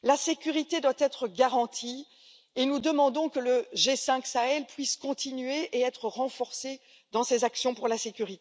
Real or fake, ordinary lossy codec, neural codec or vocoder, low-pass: real; none; none; none